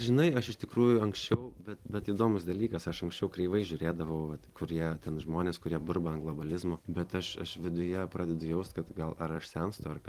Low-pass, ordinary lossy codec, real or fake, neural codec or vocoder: 14.4 kHz; Opus, 24 kbps; fake; vocoder, 44.1 kHz, 128 mel bands every 256 samples, BigVGAN v2